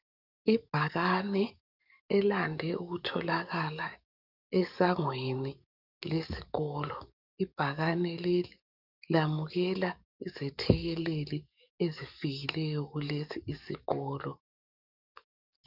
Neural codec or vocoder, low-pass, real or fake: codec, 44.1 kHz, 7.8 kbps, DAC; 5.4 kHz; fake